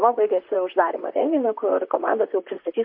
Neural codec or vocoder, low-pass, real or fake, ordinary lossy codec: vocoder, 44.1 kHz, 128 mel bands, Pupu-Vocoder; 5.4 kHz; fake; MP3, 32 kbps